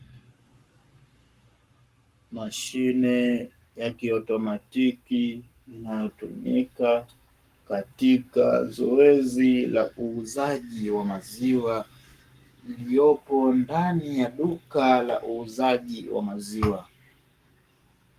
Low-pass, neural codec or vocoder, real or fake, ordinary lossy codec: 14.4 kHz; codec, 44.1 kHz, 7.8 kbps, Pupu-Codec; fake; Opus, 32 kbps